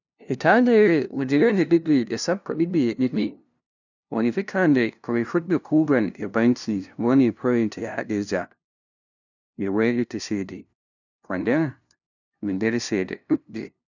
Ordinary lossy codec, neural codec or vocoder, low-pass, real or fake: none; codec, 16 kHz, 0.5 kbps, FunCodec, trained on LibriTTS, 25 frames a second; 7.2 kHz; fake